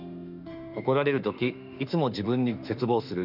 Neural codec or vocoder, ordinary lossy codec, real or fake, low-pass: autoencoder, 48 kHz, 32 numbers a frame, DAC-VAE, trained on Japanese speech; none; fake; 5.4 kHz